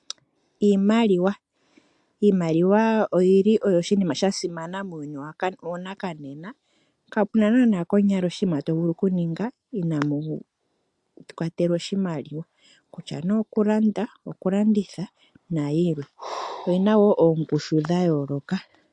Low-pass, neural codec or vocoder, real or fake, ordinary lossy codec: 10.8 kHz; none; real; AAC, 64 kbps